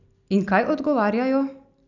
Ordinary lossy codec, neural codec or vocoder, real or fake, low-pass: none; none; real; 7.2 kHz